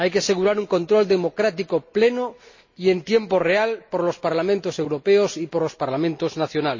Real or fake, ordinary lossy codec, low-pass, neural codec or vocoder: real; MP3, 32 kbps; 7.2 kHz; none